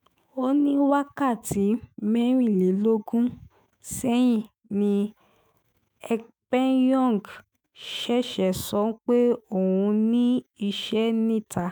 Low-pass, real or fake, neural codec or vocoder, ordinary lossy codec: none; fake; autoencoder, 48 kHz, 128 numbers a frame, DAC-VAE, trained on Japanese speech; none